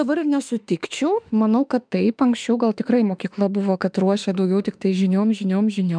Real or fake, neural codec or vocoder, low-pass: fake; autoencoder, 48 kHz, 32 numbers a frame, DAC-VAE, trained on Japanese speech; 9.9 kHz